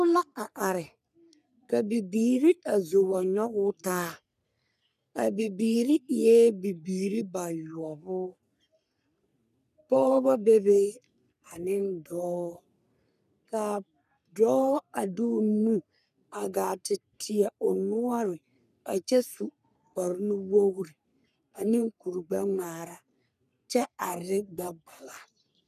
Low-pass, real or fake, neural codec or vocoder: 14.4 kHz; fake; codec, 44.1 kHz, 3.4 kbps, Pupu-Codec